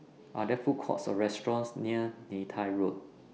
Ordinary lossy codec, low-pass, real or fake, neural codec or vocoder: none; none; real; none